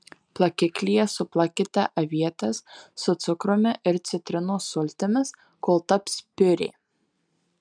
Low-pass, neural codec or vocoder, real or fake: 9.9 kHz; none; real